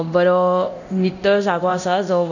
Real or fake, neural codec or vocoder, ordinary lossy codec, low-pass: fake; codec, 24 kHz, 0.9 kbps, DualCodec; none; 7.2 kHz